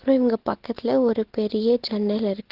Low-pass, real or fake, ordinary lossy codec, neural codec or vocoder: 5.4 kHz; real; Opus, 16 kbps; none